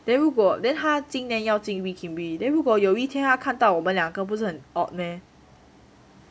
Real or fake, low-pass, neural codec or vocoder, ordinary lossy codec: real; none; none; none